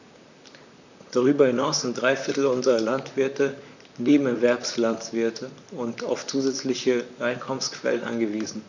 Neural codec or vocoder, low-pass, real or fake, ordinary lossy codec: vocoder, 44.1 kHz, 128 mel bands, Pupu-Vocoder; 7.2 kHz; fake; none